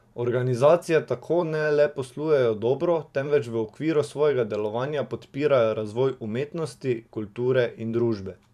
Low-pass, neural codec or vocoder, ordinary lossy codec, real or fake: 14.4 kHz; vocoder, 44.1 kHz, 128 mel bands every 512 samples, BigVGAN v2; none; fake